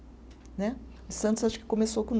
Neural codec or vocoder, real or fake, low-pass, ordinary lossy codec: none; real; none; none